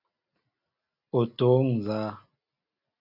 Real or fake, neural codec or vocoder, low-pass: real; none; 5.4 kHz